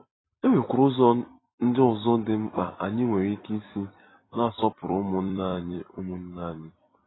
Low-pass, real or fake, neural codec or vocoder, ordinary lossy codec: 7.2 kHz; fake; vocoder, 44.1 kHz, 128 mel bands every 256 samples, BigVGAN v2; AAC, 16 kbps